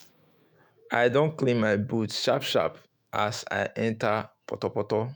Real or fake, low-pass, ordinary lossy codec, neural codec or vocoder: fake; none; none; autoencoder, 48 kHz, 128 numbers a frame, DAC-VAE, trained on Japanese speech